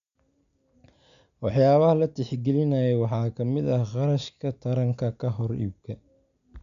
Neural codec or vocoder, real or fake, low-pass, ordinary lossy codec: none; real; 7.2 kHz; MP3, 96 kbps